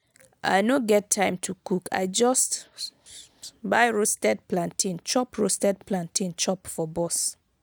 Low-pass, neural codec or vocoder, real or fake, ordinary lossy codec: none; none; real; none